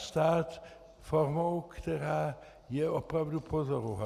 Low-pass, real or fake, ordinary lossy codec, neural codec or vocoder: 14.4 kHz; real; Opus, 64 kbps; none